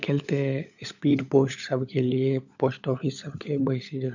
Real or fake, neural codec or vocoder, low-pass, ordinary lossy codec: fake; codec, 16 kHz, 16 kbps, FunCodec, trained on LibriTTS, 50 frames a second; 7.2 kHz; none